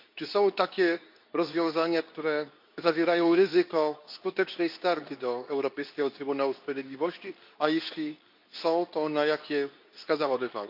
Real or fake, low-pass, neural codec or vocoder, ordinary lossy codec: fake; 5.4 kHz; codec, 24 kHz, 0.9 kbps, WavTokenizer, medium speech release version 2; none